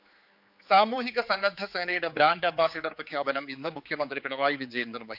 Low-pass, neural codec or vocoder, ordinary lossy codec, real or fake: 5.4 kHz; codec, 16 kHz, 4 kbps, X-Codec, HuBERT features, trained on general audio; none; fake